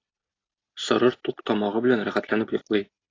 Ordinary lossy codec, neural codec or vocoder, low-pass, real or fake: AAC, 32 kbps; none; 7.2 kHz; real